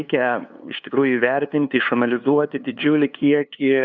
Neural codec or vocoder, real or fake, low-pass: codec, 16 kHz, 2 kbps, FunCodec, trained on LibriTTS, 25 frames a second; fake; 7.2 kHz